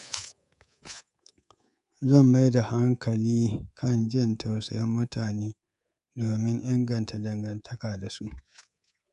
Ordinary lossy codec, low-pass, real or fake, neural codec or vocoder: none; 10.8 kHz; fake; codec, 24 kHz, 3.1 kbps, DualCodec